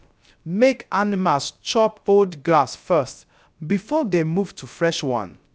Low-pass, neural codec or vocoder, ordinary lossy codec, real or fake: none; codec, 16 kHz, 0.3 kbps, FocalCodec; none; fake